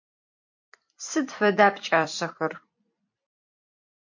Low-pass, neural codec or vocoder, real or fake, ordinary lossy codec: 7.2 kHz; vocoder, 44.1 kHz, 128 mel bands every 256 samples, BigVGAN v2; fake; MP3, 48 kbps